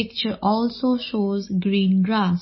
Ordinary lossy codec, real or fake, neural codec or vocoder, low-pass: MP3, 24 kbps; real; none; 7.2 kHz